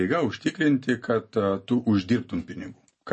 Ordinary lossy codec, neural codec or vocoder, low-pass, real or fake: MP3, 32 kbps; none; 10.8 kHz; real